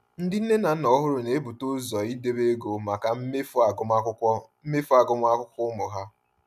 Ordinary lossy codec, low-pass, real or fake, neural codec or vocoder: none; 14.4 kHz; real; none